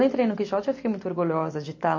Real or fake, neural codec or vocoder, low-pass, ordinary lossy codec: real; none; 7.2 kHz; MP3, 32 kbps